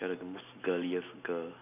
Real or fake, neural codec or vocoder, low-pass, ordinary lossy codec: real; none; 3.6 kHz; none